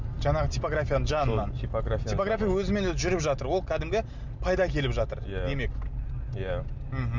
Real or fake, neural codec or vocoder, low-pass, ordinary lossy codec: real; none; 7.2 kHz; none